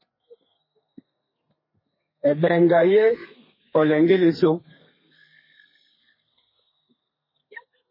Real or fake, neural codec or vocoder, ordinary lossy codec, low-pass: fake; codec, 32 kHz, 1.9 kbps, SNAC; MP3, 24 kbps; 5.4 kHz